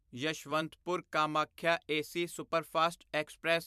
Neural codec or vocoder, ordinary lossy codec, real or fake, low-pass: vocoder, 44.1 kHz, 128 mel bands every 256 samples, BigVGAN v2; MP3, 96 kbps; fake; 14.4 kHz